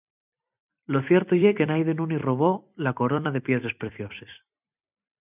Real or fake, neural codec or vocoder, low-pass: fake; vocoder, 44.1 kHz, 128 mel bands every 256 samples, BigVGAN v2; 3.6 kHz